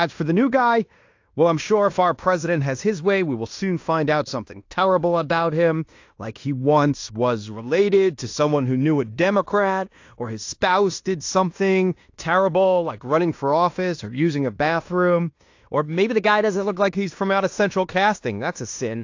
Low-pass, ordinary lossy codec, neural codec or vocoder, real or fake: 7.2 kHz; AAC, 48 kbps; codec, 16 kHz in and 24 kHz out, 0.9 kbps, LongCat-Audio-Codec, fine tuned four codebook decoder; fake